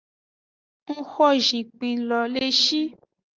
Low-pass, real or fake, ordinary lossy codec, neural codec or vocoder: 7.2 kHz; real; Opus, 32 kbps; none